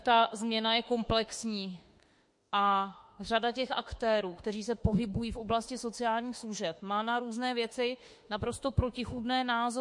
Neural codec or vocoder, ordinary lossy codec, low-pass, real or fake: autoencoder, 48 kHz, 32 numbers a frame, DAC-VAE, trained on Japanese speech; MP3, 48 kbps; 10.8 kHz; fake